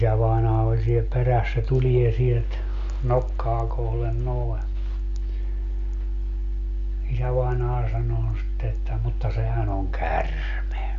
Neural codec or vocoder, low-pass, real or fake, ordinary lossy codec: none; 7.2 kHz; real; none